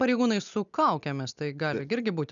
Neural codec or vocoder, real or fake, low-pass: none; real; 7.2 kHz